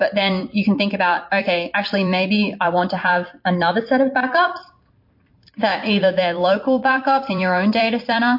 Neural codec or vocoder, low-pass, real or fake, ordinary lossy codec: vocoder, 44.1 kHz, 128 mel bands every 256 samples, BigVGAN v2; 5.4 kHz; fake; MP3, 32 kbps